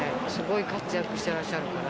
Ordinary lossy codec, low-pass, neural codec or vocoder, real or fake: none; none; none; real